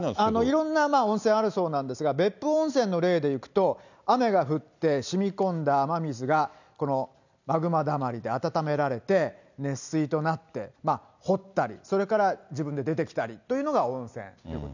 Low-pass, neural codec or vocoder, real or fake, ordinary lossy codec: 7.2 kHz; none; real; none